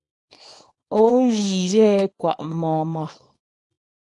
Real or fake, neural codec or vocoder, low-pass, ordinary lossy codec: fake; codec, 24 kHz, 0.9 kbps, WavTokenizer, small release; 10.8 kHz; AAC, 48 kbps